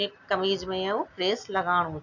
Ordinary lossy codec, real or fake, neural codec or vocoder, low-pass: none; real; none; 7.2 kHz